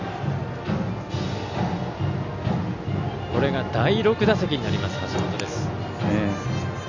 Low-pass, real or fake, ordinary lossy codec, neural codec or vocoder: 7.2 kHz; real; none; none